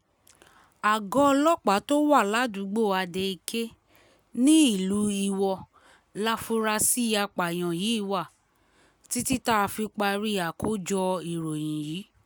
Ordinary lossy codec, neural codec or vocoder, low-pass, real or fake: none; none; none; real